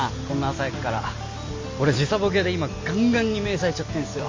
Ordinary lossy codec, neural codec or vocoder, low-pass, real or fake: MP3, 32 kbps; none; 7.2 kHz; real